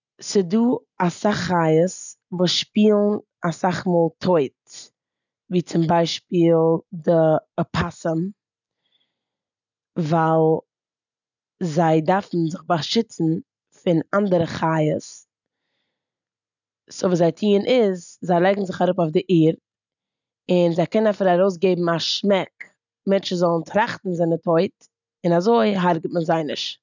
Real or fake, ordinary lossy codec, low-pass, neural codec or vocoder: real; none; 7.2 kHz; none